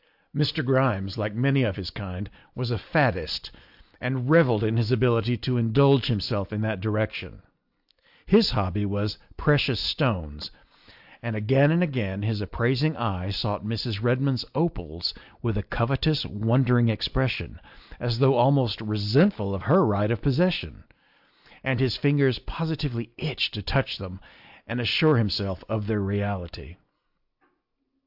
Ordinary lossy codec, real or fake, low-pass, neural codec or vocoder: AAC, 48 kbps; real; 5.4 kHz; none